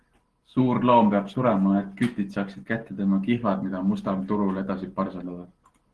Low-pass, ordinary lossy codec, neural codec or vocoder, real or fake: 10.8 kHz; Opus, 16 kbps; none; real